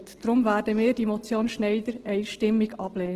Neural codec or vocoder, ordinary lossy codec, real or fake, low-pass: none; Opus, 16 kbps; real; 14.4 kHz